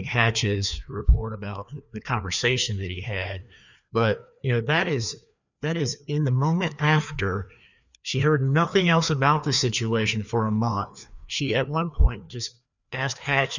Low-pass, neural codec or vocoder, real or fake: 7.2 kHz; codec, 16 kHz, 2 kbps, FreqCodec, larger model; fake